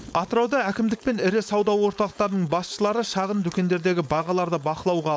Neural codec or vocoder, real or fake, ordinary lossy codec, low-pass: codec, 16 kHz, 4.8 kbps, FACodec; fake; none; none